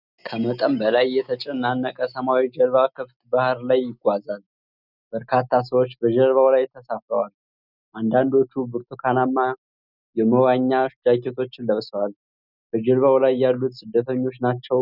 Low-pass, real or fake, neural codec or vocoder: 5.4 kHz; real; none